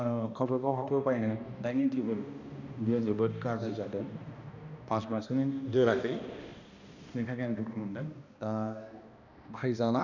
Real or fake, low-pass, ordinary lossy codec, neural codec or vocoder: fake; 7.2 kHz; none; codec, 16 kHz, 1 kbps, X-Codec, HuBERT features, trained on balanced general audio